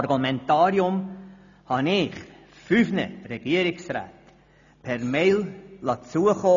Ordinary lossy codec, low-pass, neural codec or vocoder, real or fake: none; 7.2 kHz; none; real